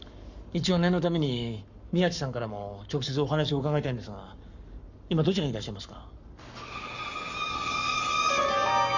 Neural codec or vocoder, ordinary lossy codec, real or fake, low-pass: codec, 44.1 kHz, 7.8 kbps, Pupu-Codec; none; fake; 7.2 kHz